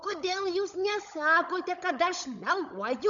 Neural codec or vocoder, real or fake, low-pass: codec, 16 kHz, 16 kbps, FunCodec, trained on LibriTTS, 50 frames a second; fake; 7.2 kHz